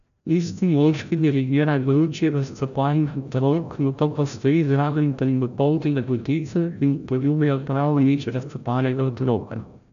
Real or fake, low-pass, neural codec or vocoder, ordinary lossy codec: fake; 7.2 kHz; codec, 16 kHz, 0.5 kbps, FreqCodec, larger model; none